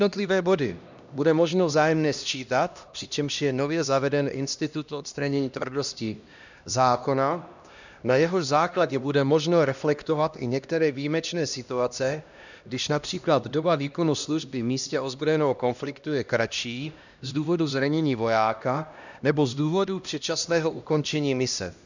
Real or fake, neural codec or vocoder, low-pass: fake; codec, 16 kHz, 1 kbps, X-Codec, HuBERT features, trained on LibriSpeech; 7.2 kHz